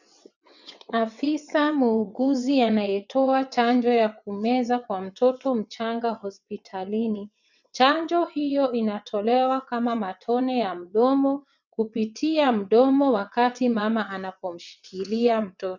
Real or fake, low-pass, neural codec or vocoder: fake; 7.2 kHz; vocoder, 22.05 kHz, 80 mel bands, WaveNeXt